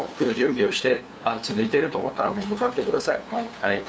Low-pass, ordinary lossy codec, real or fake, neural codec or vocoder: none; none; fake; codec, 16 kHz, 2 kbps, FunCodec, trained on LibriTTS, 25 frames a second